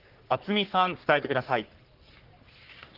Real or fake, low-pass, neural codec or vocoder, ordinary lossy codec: fake; 5.4 kHz; codec, 44.1 kHz, 3.4 kbps, Pupu-Codec; Opus, 16 kbps